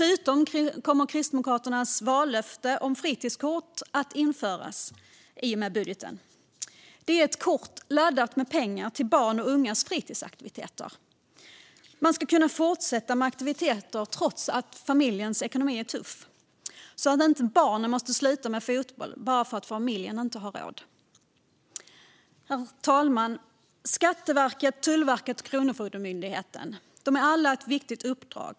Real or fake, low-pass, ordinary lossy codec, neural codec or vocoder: real; none; none; none